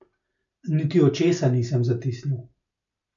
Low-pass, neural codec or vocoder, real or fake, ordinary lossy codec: 7.2 kHz; none; real; none